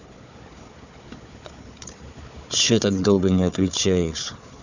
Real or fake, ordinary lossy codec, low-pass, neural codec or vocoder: fake; none; 7.2 kHz; codec, 16 kHz, 4 kbps, FunCodec, trained on Chinese and English, 50 frames a second